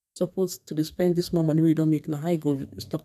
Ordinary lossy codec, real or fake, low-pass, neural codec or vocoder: none; fake; 14.4 kHz; codec, 32 kHz, 1.9 kbps, SNAC